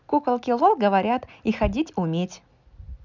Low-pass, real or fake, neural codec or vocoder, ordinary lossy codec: 7.2 kHz; real; none; none